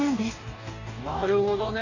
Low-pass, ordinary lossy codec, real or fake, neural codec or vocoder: 7.2 kHz; none; fake; codec, 44.1 kHz, 2.6 kbps, DAC